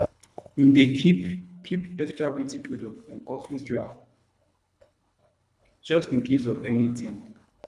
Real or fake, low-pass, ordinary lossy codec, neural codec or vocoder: fake; none; none; codec, 24 kHz, 1.5 kbps, HILCodec